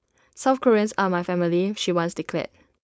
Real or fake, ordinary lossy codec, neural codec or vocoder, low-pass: fake; none; codec, 16 kHz, 4.8 kbps, FACodec; none